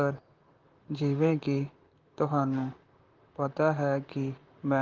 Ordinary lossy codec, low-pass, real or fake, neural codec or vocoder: Opus, 32 kbps; 7.2 kHz; fake; vocoder, 44.1 kHz, 128 mel bands, Pupu-Vocoder